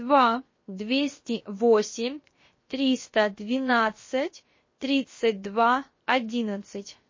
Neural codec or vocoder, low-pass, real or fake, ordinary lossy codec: codec, 16 kHz, 0.7 kbps, FocalCodec; 7.2 kHz; fake; MP3, 32 kbps